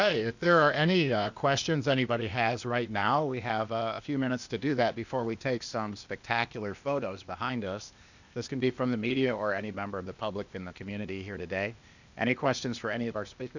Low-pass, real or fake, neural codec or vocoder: 7.2 kHz; fake; codec, 16 kHz, 0.8 kbps, ZipCodec